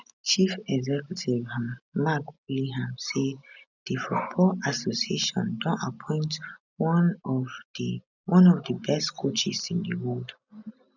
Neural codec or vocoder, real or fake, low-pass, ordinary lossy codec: none; real; 7.2 kHz; none